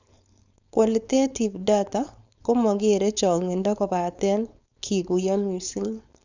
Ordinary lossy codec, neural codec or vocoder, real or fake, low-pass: none; codec, 16 kHz, 4.8 kbps, FACodec; fake; 7.2 kHz